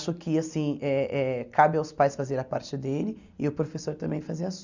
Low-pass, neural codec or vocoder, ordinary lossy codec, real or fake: 7.2 kHz; none; none; real